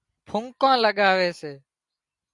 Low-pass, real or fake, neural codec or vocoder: 10.8 kHz; real; none